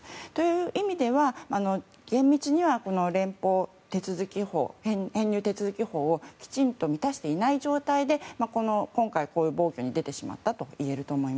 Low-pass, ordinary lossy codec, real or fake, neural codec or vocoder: none; none; real; none